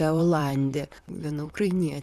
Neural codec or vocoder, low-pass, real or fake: vocoder, 44.1 kHz, 128 mel bands, Pupu-Vocoder; 14.4 kHz; fake